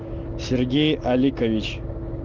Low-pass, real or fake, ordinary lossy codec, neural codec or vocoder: 7.2 kHz; real; Opus, 24 kbps; none